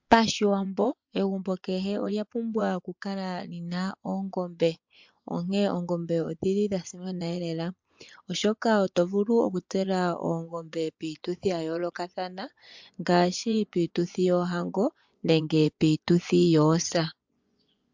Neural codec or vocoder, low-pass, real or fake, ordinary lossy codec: vocoder, 24 kHz, 100 mel bands, Vocos; 7.2 kHz; fake; MP3, 64 kbps